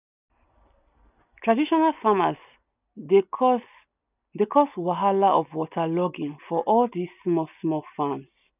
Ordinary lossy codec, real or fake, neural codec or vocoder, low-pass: none; real; none; 3.6 kHz